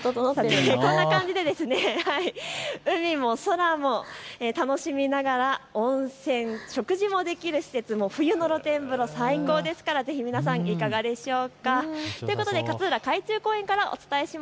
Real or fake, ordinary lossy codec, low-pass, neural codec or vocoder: real; none; none; none